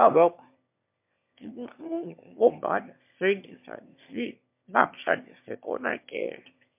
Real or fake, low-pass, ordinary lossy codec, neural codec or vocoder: fake; 3.6 kHz; MP3, 32 kbps; autoencoder, 22.05 kHz, a latent of 192 numbers a frame, VITS, trained on one speaker